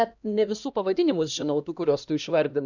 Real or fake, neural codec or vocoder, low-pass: fake; codec, 16 kHz, 1 kbps, X-Codec, HuBERT features, trained on LibriSpeech; 7.2 kHz